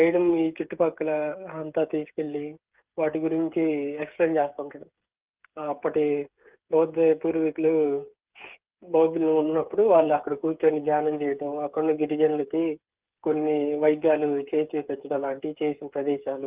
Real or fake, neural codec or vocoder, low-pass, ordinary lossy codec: fake; codec, 16 kHz, 8 kbps, FreqCodec, smaller model; 3.6 kHz; Opus, 32 kbps